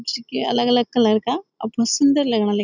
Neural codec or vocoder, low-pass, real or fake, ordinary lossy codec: none; 7.2 kHz; real; none